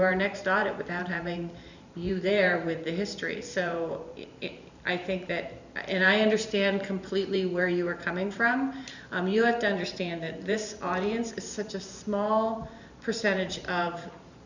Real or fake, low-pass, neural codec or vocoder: fake; 7.2 kHz; vocoder, 44.1 kHz, 128 mel bands every 512 samples, BigVGAN v2